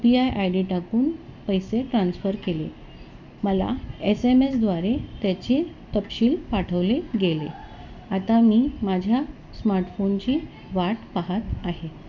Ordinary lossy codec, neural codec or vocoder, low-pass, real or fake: none; none; 7.2 kHz; real